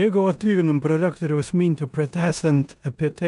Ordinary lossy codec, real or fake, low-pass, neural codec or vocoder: MP3, 96 kbps; fake; 10.8 kHz; codec, 16 kHz in and 24 kHz out, 0.9 kbps, LongCat-Audio-Codec, four codebook decoder